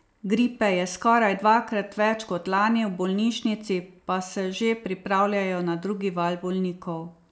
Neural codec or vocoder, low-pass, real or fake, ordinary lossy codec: none; none; real; none